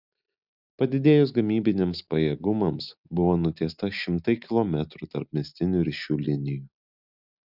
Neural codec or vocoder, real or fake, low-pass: none; real; 5.4 kHz